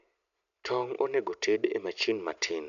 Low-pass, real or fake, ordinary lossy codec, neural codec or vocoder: 7.2 kHz; real; none; none